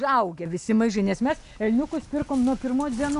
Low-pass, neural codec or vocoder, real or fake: 10.8 kHz; none; real